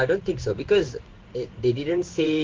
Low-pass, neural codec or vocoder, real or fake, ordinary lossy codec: 7.2 kHz; codec, 16 kHz in and 24 kHz out, 1 kbps, XY-Tokenizer; fake; Opus, 16 kbps